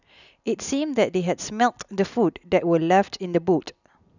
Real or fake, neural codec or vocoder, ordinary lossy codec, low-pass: real; none; none; 7.2 kHz